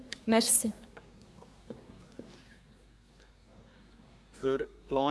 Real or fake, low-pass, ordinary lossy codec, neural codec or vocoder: fake; none; none; codec, 24 kHz, 1 kbps, SNAC